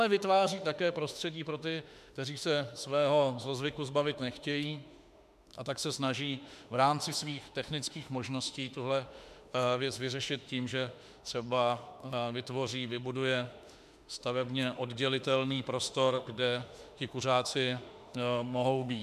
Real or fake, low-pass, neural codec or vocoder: fake; 14.4 kHz; autoencoder, 48 kHz, 32 numbers a frame, DAC-VAE, trained on Japanese speech